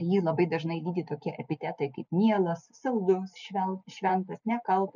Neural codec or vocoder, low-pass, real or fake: none; 7.2 kHz; real